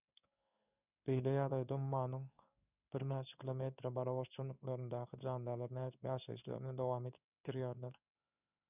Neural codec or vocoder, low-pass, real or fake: codec, 16 kHz in and 24 kHz out, 1 kbps, XY-Tokenizer; 3.6 kHz; fake